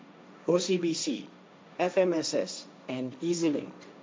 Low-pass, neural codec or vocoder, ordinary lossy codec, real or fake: none; codec, 16 kHz, 1.1 kbps, Voila-Tokenizer; none; fake